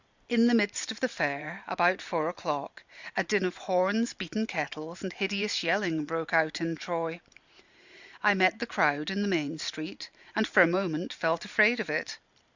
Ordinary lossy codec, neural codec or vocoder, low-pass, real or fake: Opus, 64 kbps; vocoder, 44.1 kHz, 128 mel bands every 512 samples, BigVGAN v2; 7.2 kHz; fake